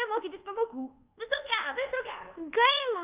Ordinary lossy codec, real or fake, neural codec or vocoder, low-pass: Opus, 32 kbps; fake; codec, 24 kHz, 1.2 kbps, DualCodec; 3.6 kHz